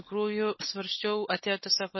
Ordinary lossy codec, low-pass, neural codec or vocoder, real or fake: MP3, 24 kbps; 7.2 kHz; none; real